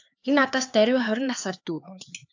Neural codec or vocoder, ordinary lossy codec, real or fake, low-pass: codec, 16 kHz, 4 kbps, X-Codec, HuBERT features, trained on LibriSpeech; AAC, 48 kbps; fake; 7.2 kHz